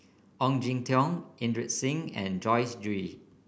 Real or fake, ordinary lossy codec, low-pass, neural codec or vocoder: real; none; none; none